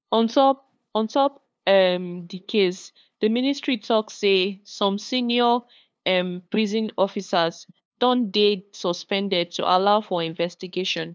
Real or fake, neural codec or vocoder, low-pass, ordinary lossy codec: fake; codec, 16 kHz, 2 kbps, FunCodec, trained on LibriTTS, 25 frames a second; none; none